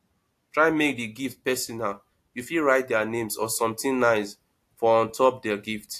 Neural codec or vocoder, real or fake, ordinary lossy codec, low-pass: none; real; AAC, 64 kbps; 14.4 kHz